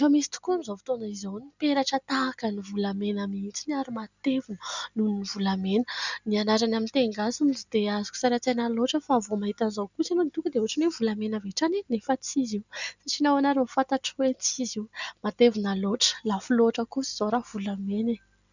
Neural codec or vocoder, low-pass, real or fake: none; 7.2 kHz; real